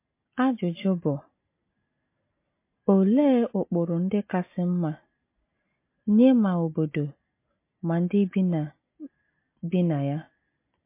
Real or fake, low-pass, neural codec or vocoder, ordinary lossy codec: real; 3.6 kHz; none; MP3, 24 kbps